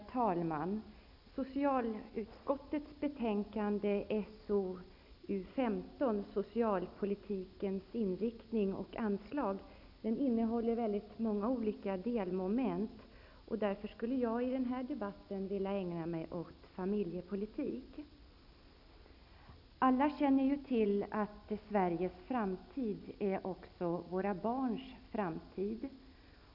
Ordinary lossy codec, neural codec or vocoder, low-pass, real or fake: none; none; 5.4 kHz; real